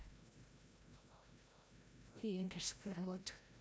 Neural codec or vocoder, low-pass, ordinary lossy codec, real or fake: codec, 16 kHz, 0.5 kbps, FreqCodec, larger model; none; none; fake